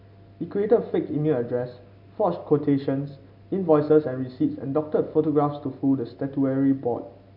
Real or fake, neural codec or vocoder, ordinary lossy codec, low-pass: real; none; none; 5.4 kHz